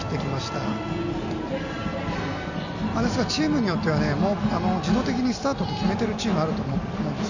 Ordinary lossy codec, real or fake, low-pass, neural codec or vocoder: none; real; 7.2 kHz; none